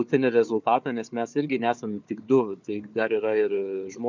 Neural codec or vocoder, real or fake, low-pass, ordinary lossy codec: codec, 16 kHz, 4 kbps, FunCodec, trained on Chinese and English, 50 frames a second; fake; 7.2 kHz; MP3, 64 kbps